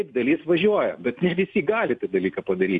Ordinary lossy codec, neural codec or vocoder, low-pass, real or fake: MP3, 64 kbps; none; 10.8 kHz; real